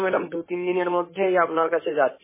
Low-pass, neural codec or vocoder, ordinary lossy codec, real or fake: 3.6 kHz; codec, 16 kHz in and 24 kHz out, 2.2 kbps, FireRedTTS-2 codec; MP3, 16 kbps; fake